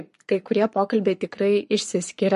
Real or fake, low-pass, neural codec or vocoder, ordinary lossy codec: fake; 14.4 kHz; codec, 44.1 kHz, 7.8 kbps, Pupu-Codec; MP3, 48 kbps